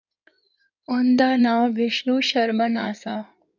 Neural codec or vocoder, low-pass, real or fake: codec, 16 kHz in and 24 kHz out, 2.2 kbps, FireRedTTS-2 codec; 7.2 kHz; fake